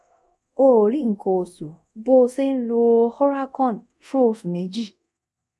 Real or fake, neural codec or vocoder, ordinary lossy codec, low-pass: fake; codec, 24 kHz, 0.5 kbps, DualCodec; none; none